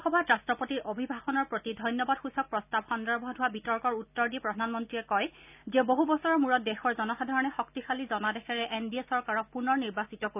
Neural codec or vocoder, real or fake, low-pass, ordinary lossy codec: none; real; 3.6 kHz; none